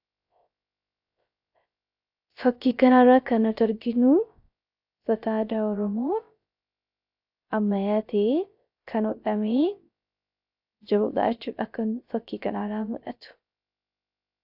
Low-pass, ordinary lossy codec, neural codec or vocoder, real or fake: 5.4 kHz; AAC, 48 kbps; codec, 16 kHz, 0.3 kbps, FocalCodec; fake